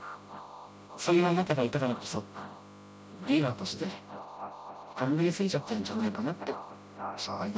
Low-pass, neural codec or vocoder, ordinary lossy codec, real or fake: none; codec, 16 kHz, 0.5 kbps, FreqCodec, smaller model; none; fake